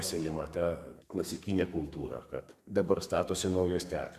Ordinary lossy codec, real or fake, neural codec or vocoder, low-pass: Opus, 64 kbps; fake; codec, 32 kHz, 1.9 kbps, SNAC; 14.4 kHz